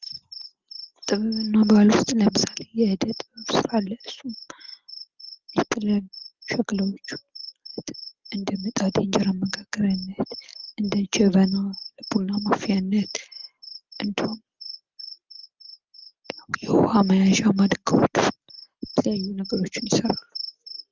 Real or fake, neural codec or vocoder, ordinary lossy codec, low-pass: real; none; Opus, 24 kbps; 7.2 kHz